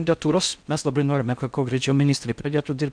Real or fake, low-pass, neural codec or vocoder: fake; 9.9 kHz; codec, 16 kHz in and 24 kHz out, 0.6 kbps, FocalCodec, streaming, 4096 codes